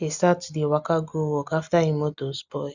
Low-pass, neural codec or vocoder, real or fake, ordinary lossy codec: 7.2 kHz; none; real; none